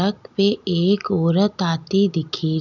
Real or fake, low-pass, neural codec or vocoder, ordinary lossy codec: real; 7.2 kHz; none; none